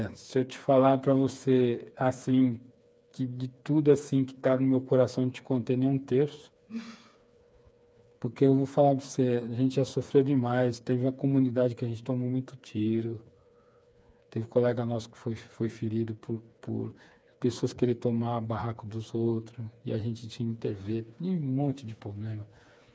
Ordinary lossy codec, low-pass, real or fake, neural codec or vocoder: none; none; fake; codec, 16 kHz, 4 kbps, FreqCodec, smaller model